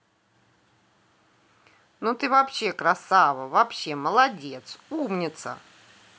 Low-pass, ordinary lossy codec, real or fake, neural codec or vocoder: none; none; real; none